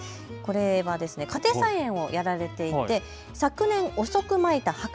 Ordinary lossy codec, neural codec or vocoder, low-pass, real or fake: none; none; none; real